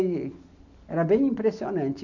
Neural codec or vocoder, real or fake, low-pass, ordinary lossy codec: none; real; 7.2 kHz; none